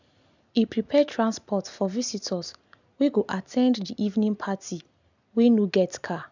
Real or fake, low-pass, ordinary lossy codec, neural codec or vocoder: real; 7.2 kHz; none; none